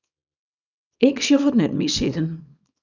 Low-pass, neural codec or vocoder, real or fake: 7.2 kHz; codec, 24 kHz, 0.9 kbps, WavTokenizer, small release; fake